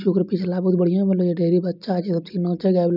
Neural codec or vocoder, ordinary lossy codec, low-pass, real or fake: none; none; 5.4 kHz; real